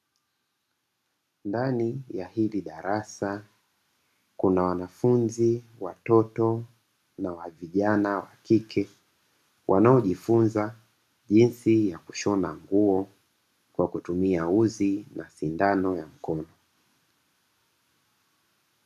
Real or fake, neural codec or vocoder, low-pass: real; none; 14.4 kHz